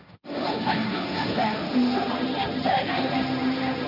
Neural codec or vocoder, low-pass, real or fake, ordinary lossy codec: codec, 16 kHz, 1.1 kbps, Voila-Tokenizer; 5.4 kHz; fake; none